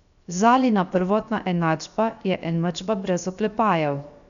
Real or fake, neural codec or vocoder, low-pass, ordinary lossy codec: fake; codec, 16 kHz, 0.7 kbps, FocalCodec; 7.2 kHz; none